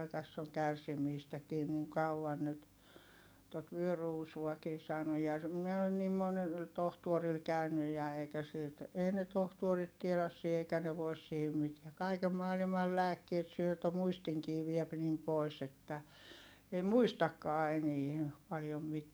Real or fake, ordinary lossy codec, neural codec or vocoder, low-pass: fake; none; codec, 44.1 kHz, 7.8 kbps, DAC; none